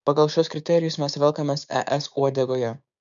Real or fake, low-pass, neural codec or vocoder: fake; 7.2 kHz; codec, 16 kHz, 6 kbps, DAC